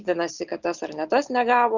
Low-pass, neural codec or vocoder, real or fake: 7.2 kHz; none; real